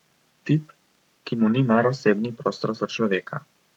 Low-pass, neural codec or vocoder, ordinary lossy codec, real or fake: 19.8 kHz; codec, 44.1 kHz, 7.8 kbps, Pupu-Codec; none; fake